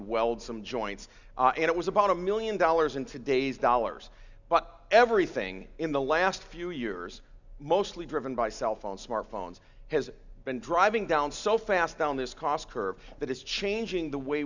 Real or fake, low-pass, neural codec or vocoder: real; 7.2 kHz; none